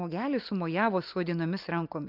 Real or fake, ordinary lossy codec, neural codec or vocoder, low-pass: real; Opus, 32 kbps; none; 5.4 kHz